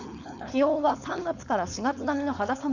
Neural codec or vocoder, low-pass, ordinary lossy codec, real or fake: codec, 16 kHz, 4.8 kbps, FACodec; 7.2 kHz; none; fake